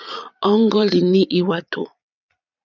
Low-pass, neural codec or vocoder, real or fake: 7.2 kHz; vocoder, 22.05 kHz, 80 mel bands, Vocos; fake